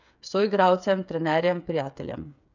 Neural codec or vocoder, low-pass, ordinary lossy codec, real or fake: codec, 16 kHz, 8 kbps, FreqCodec, smaller model; 7.2 kHz; none; fake